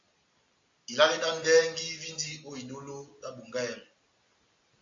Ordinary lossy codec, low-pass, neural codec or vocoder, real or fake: AAC, 48 kbps; 7.2 kHz; none; real